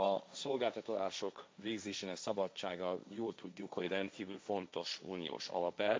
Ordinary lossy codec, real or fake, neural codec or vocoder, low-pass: none; fake; codec, 16 kHz, 1.1 kbps, Voila-Tokenizer; none